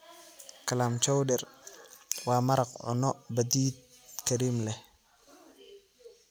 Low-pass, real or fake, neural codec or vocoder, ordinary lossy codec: none; real; none; none